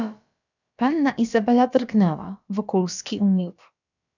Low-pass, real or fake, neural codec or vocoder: 7.2 kHz; fake; codec, 16 kHz, about 1 kbps, DyCAST, with the encoder's durations